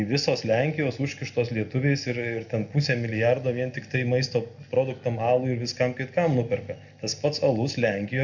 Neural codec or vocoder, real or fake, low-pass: none; real; 7.2 kHz